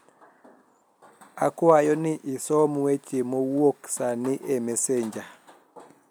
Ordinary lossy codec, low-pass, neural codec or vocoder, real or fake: none; none; none; real